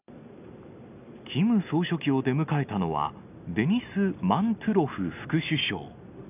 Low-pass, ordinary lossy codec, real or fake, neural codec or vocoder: 3.6 kHz; none; real; none